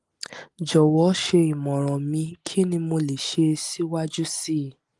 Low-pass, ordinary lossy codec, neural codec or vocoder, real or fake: 10.8 kHz; Opus, 24 kbps; none; real